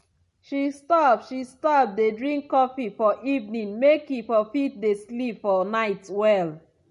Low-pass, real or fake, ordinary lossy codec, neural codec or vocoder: 14.4 kHz; real; MP3, 48 kbps; none